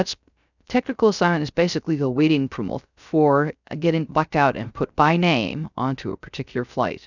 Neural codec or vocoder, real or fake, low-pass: codec, 16 kHz, 0.3 kbps, FocalCodec; fake; 7.2 kHz